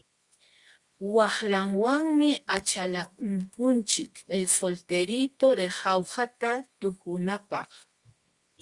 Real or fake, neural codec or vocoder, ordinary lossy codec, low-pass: fake; codec, 24 kHz, 0.9 kbps, WavTokenizer, medium music audio release; Opus, 64 kbps; 10.8 kHz